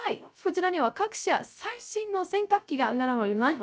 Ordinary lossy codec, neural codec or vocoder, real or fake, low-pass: none; codec, 16 kHz, 0.3 kbps, FocalCodec; fake; none